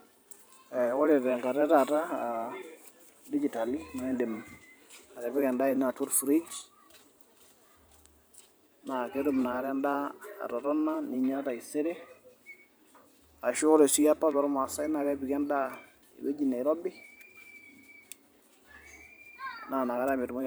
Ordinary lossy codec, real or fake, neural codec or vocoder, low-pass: none; fake; vocoder, 44.1 kHz, 128 mel bands every 512 samples, BigVGAN v2; none